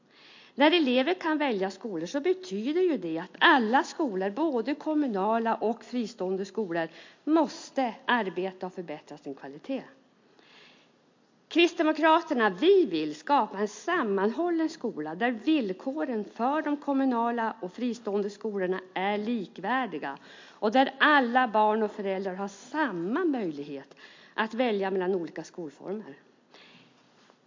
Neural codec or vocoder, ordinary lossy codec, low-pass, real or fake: none; MP3, 48 kbps; 7.2 kHz; real